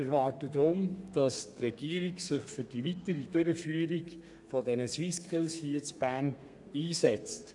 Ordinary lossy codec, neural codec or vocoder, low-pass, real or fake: none; codec, 32 kHz, 1.9 kbps, SNAC; 10.8 kHz; fake